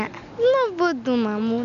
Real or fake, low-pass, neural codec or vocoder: real; 7.2 kHz; none